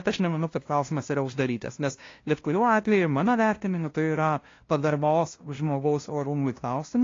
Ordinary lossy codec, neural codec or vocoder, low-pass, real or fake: AAC, 32 kbps; codec, 16 kHz, 0.5 kbps, FunCodec, trained on LibriTTS, 25 frames a second; 7.2 kHz; fake